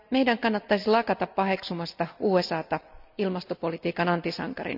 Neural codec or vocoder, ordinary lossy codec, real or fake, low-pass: none; none; real; 5.4 kHz